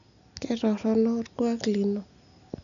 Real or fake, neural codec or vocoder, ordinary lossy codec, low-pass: real; none; none; 7.2 kHz